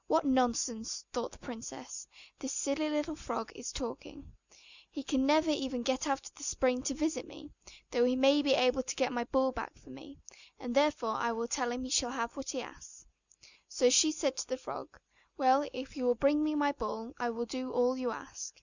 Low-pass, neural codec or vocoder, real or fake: 7.2 kHz; none; real